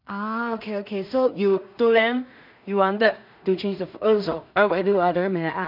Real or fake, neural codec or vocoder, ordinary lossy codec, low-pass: fake; codec, 16 kHz in and 24 kHz out, 0.4 kbps, LongCat-Audio-Codec, two codebook decoder; none; 5.4 kHz